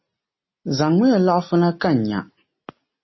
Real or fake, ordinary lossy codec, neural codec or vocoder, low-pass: real; MP3, 24 kbps; none; 7.2 kHz